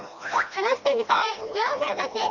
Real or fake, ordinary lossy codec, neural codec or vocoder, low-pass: fake; none; codec, 16 kHz, 1 kbps, FreqCodec, smaller model; 7.2 kHz